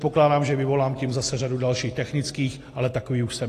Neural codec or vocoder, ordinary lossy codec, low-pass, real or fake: none; AAC, 48 kbps; 14.4 kHz; real